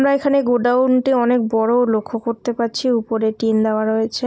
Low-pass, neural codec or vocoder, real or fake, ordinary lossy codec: none; none; real; none